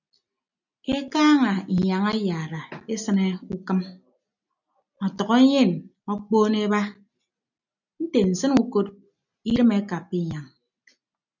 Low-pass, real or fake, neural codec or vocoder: 7.2 kHz; real; none